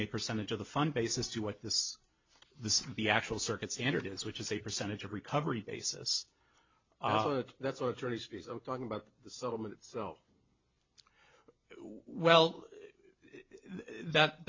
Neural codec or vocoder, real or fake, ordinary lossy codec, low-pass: none; real; MP3, 48 kbps; 7.2 kHz